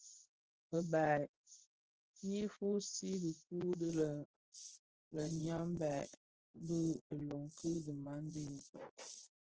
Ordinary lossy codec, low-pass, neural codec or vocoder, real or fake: Opus, 16 kbps; 7.2 kHz; vocoder, 24 kHz, 100 mel bands, Vocos; fake